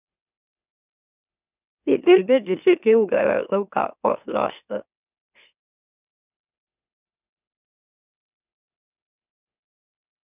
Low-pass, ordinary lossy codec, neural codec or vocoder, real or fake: 3.6 kHz; none; autoencoder, 44.1 kHz, a latent of 192 numbers a frame, MeloTTS; fake